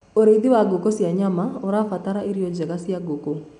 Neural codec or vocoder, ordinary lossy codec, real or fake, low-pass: none; none; real; 10.8 kHz